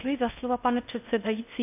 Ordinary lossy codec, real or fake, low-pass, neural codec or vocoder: AAC, 32 kbps; fake; 3.6 kHz; codec, 16 kHz in and 24 kHz out, 0.6 kbps, FocalCodec, streaming, 4096 codes